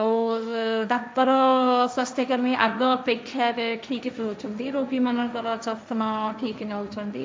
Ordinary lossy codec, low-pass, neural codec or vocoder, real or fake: none; none; codec, 16 kHz, 1.1 kbps, Voila-Tokenizer; fake